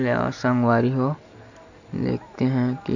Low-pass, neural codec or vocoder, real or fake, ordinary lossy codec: 7.2 kHz; none; real; none